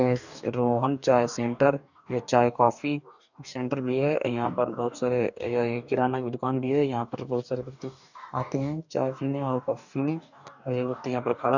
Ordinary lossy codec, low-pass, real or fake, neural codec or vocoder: none; 7.2 kHz; fake; codec, 44.1 kHz, 2.6 kbps, DAC